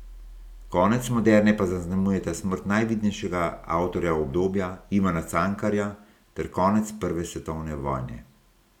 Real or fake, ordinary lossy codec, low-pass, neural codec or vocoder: real; none; 19.8 kHz; none